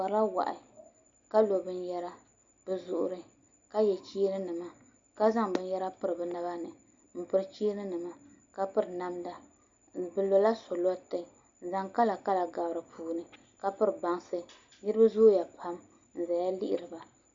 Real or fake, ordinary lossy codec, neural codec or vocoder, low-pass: real; Opus, 64 kbps; none; 7.2 kHz